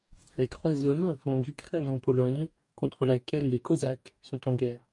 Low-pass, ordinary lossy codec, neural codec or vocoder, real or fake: 10.8 kHz; MP3, 96 kbps; codec, 44.1 kHz, 2.6 kbps, DAC; fake